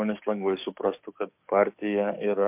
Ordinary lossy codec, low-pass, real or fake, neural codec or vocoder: MP3, 32 kbps; 3.6 kHz; fake; codec, 44.1 kHz, 7.8 kbps, DAC